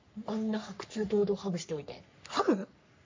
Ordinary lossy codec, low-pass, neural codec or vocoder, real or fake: MP3, 48 kbps; 7.2 kHz; codec, 44.1 kHz, 3.4 kbps, Pupu-Codec; fake